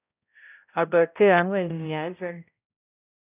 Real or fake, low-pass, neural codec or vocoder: fake; 3.6 kHz; codec, 16 kHz, 0.5 kbps, X-Codec, HuBERT features, trained on balanced general audio